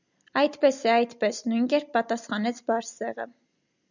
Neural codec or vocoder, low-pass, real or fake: none; 7.2 kHz; real